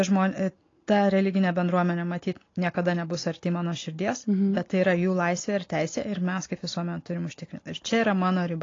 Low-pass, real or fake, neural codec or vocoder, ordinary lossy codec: 7.2 kHz; real; none; AAC, 32 kbps